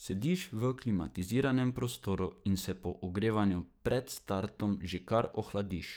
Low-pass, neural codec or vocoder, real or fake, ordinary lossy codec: none; codec, 44.1 kHz, 7.8 kbps, DAC; fake; none